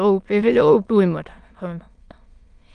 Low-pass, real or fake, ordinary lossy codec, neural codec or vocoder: 9.9 kHz; fake; Opus, 32 kbps; autoencoder, 22.05 kHz, a latent of 192 numbers a frame, VITS, trained on many speakers